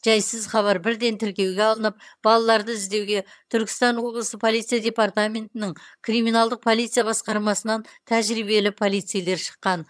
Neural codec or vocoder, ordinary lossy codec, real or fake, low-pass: vocoder, 22.05 kHz, 80 mel bands, HiFi-GAN; none; fake; none